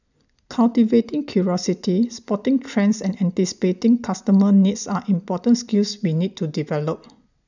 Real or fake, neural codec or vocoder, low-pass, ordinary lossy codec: real; none; 7.2 kHz; none